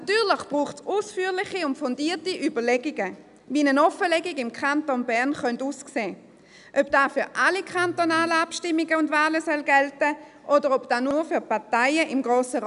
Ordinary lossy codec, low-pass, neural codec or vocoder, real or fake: none; 10.8 kHz; none; real